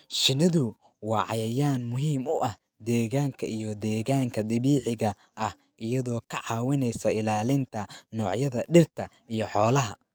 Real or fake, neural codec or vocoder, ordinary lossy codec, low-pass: fake; codec, 44.1 kHz, 7.8 kbps, DAC; none; none